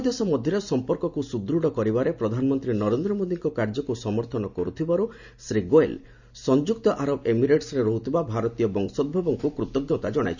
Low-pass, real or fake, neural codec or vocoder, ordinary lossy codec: 7.2 kHz; real; none; none